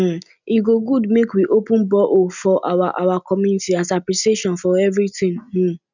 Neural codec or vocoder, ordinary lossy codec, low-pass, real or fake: none; none; 7.2 kHz; real